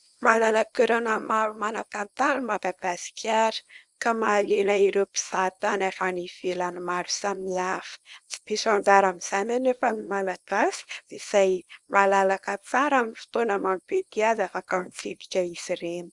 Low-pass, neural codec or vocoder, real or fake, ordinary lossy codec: 10.8 kHz; codec, 24 kHz, 0.9 kbps, WavTokenizer, small release; fake; Opus, 64 kbps